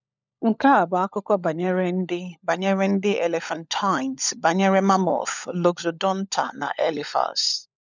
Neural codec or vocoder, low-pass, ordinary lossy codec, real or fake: codec, 16 kHz, 16 kbps, FunCodec, trained on LibriTTS, 50 frames a second; 7.2 kHz; none; fake